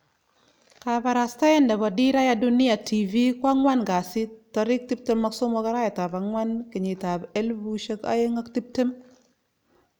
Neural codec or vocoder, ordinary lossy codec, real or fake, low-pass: none; none; real; none